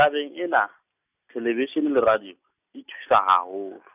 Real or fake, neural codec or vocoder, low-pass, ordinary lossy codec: real; none; 3.6 kHz; none